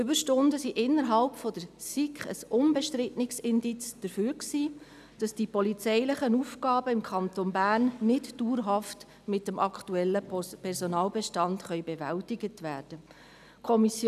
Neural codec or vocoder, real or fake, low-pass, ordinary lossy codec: none; real; 14.4 kHz; none